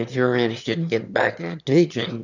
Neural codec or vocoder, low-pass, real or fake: autoencoder, 22.05 kHz, a latent of 192 numbers a frame, VITS, trained on one speaker; 7.2 kHz; fake